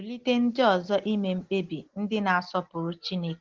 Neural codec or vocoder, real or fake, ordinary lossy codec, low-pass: none; real; Opus, 16 kbps; 7.2 kHz